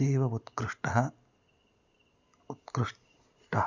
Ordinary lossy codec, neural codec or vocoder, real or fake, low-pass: none; none; real; 7.2 kHz